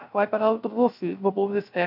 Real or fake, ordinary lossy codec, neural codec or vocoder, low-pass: fake; none; codec, 16 kHz, 0.3 kbps, FocalCodec; 5.4 kHz